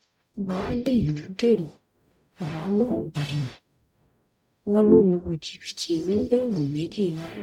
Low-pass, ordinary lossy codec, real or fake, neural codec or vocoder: 19.8 kHz; none; fake; codec, 44.1 kHz, 0.9 kbps, DAC